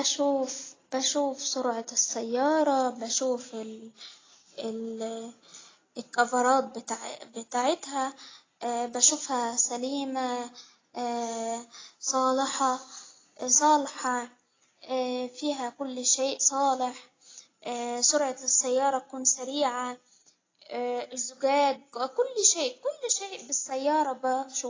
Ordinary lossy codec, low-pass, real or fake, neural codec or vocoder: AAC, 32 kbps; 7.2 kHz; real; none